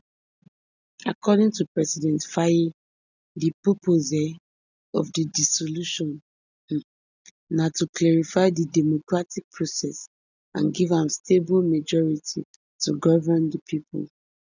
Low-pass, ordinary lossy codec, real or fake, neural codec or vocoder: 7.2 kHz; none; real; none